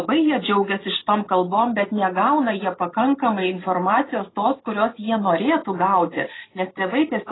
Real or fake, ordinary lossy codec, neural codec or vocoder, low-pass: real; AAC, 16 kbps; none; 7.2 kHz